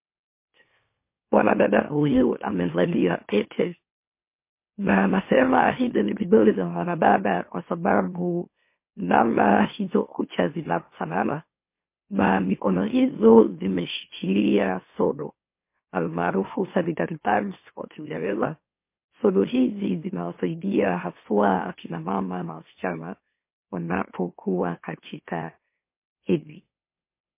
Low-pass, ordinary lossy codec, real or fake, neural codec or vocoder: 3.6 kHz; MP3, 24 kbps; fake; autoencoder, 44.1 kHz, a latent of 192 numbers a frame, MeloTTS